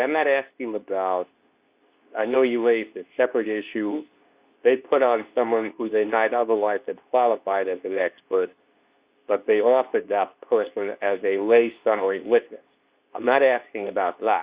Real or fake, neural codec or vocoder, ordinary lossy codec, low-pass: fake; codec, 24 kHz, 0.9 kbps, WavTokenizer, medium speech release version 2; Opus, 64 kbps; 3.6 kHz